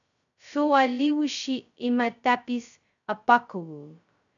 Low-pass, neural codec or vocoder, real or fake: 7.2 kHz; codec, 16 kHz, 0.2 kbps, FocalCodec; fake